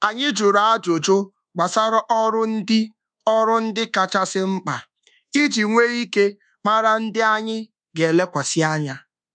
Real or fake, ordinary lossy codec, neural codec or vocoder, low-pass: fake; none; codec, 24 kHz, 1.2 kbps, DualCodec; 9.9 kHz